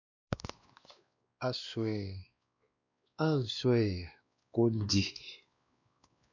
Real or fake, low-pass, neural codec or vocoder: fake; 7.2 kHz; codec, 16 kHz, 2 kbps, X-Codec, WavLM features, trained on Multilingual LibriSpeech